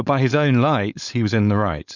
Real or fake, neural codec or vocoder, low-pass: fake; codec, 16 kHz, 4.8 kbps, FACodec; 7.2 kHz